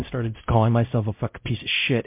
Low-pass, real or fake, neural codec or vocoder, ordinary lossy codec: 3.6 kHz; fake; codec, 16 kHz, 0.5 kbps, X-Codec, WavLM features, trained on Multilingual LibriSpeech; MP3, 32 kbps